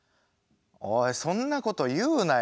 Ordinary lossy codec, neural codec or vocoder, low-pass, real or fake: none; none; none; real